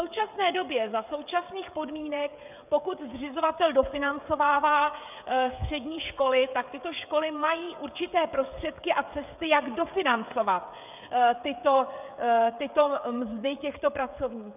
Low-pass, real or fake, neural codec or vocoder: 3.6 kHz; fake; codec, 16 kHz, 16 kbps, FreqCodec, smaller model